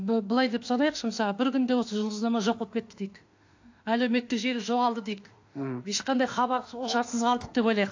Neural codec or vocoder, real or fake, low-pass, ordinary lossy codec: autoencoder, 48 kHz, 32 numbers a frame, DAC-VAE, trained on Japanese speech; fake; 7.2 kHz; AAC, 48 kbps